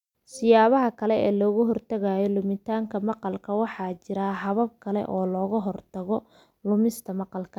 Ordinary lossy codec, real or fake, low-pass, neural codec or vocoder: none; real; 19.8 kHz; none